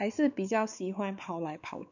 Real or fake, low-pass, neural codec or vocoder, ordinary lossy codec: fake; 7.2 kHz; codec, 16 kHz, 4 kbps, X-Codec, WavLM features, trained on Multilingual LibriSpeech; none